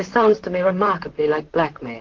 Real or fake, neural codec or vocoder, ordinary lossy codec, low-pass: fake; vocoder, 44.1 kHz, 128 mel bands, Pupu-Vocoder; Opus, 16 kbps; 7.2 kHz